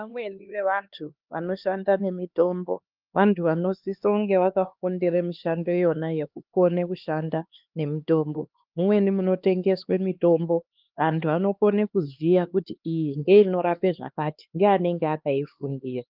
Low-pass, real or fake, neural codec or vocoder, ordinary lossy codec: 5.4 kHz; fake; codec, 16 kHz, 2 kbps, X-Codec, HuBERT features, trained on LibriSpeech; Opus, 24 kbps